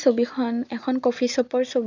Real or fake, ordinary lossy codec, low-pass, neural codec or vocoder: real; none; 7.2 kHz; none